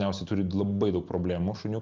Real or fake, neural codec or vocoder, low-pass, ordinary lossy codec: real; none; 7.2 kHz; Opus, 32 kbps